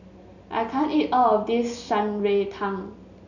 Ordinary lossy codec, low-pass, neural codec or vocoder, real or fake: none; 7.2 kHz; none; real